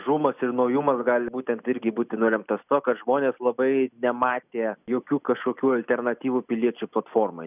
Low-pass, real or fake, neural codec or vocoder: 3.6 kHz; real; none